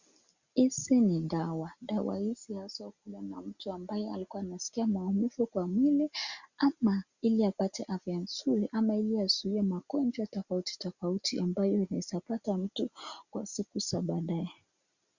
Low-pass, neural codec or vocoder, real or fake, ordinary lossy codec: 7.2 kHz; none; real; Opus, 64 kbps